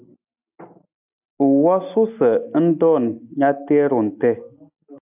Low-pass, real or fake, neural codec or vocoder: 3.6 kHz; real; none